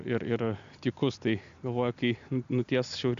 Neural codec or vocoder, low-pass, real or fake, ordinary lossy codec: none; 7.2 kHz; real; MP3, 64 kbps